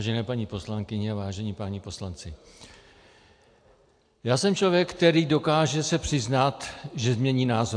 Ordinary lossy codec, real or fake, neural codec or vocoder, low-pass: AAC, 64 kbps; real; none; 9.9 kHz